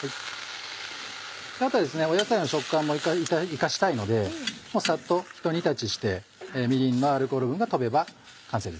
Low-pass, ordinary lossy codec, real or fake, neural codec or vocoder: none; none; real; none